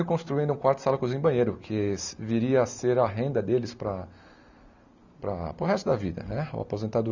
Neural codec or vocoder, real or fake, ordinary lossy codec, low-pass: none; real; none; 7.2 kHz